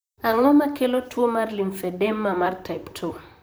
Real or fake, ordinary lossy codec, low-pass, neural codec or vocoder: fake; none; none; vocoder, 44.1 kHz, 128 mel bands, Pupu-Vocoder